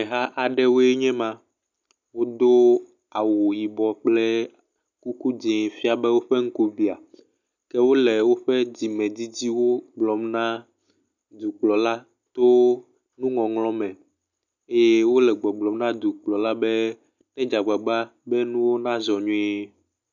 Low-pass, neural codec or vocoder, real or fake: 7.2 kHz; none; real